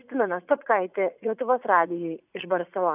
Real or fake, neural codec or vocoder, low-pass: fake; vocoder, 44.1 kHz, 80 mel bands, Vocos; 3.6 kHz